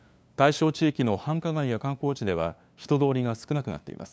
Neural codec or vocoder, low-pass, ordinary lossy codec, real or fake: codec, 16 kHz, 2 kbps, FunCodec, trained on LibriTTS, 25 frames a second; none; none; fake